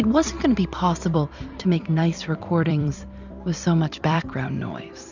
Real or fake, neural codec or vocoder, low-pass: fake; vocoder, 44.1 kHz, 128 mel bands every 256 samples, BigVGAN v2; 7.2 kHz